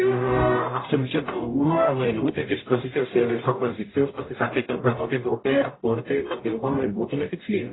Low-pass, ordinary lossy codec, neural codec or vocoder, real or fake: 7.2 kHz; AAC, 16 kbps; codec, 44.1 kHz, 0.9 kbps, DAC; fake